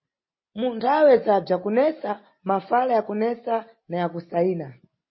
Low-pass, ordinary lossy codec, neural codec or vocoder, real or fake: 7.2 kHz; MP3, 24 kbps; none; real